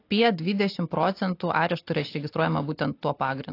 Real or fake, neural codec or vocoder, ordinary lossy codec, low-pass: real; none; AAC, 32 kbps; 5.4 kHz